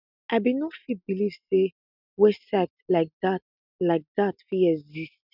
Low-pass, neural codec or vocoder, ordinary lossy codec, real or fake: 5.4 kHz; none; none; real